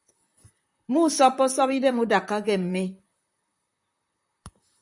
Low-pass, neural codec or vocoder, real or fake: 10.8 kHz; vocoder, 44.1 kHz, 128 mel bands, Pupu-Vocoder; fake